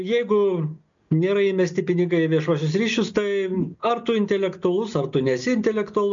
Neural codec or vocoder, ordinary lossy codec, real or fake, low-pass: none; MP3, 64 kbps; real; 7.2 kHz